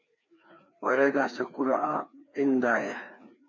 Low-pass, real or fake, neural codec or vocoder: 7.2 kHz; fake; codec, 16 kHz, 2 kbps, FreqCodec, larger model